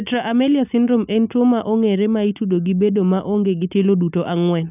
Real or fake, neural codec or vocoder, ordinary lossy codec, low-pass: real; none; none; 3.6 kHz